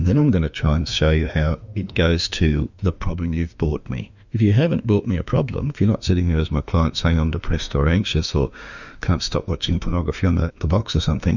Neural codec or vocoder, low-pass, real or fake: autoencoder, 48 kHz, 32 numbers a frame, DAC-VAE, trained on Japanese speech; 7.2 kHz; fake